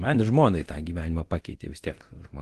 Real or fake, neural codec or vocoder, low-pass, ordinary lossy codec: fake; codec, 24 kHz, 0.9 kbps, DualCodec; 10.8 kHz; Opus, 16 kbps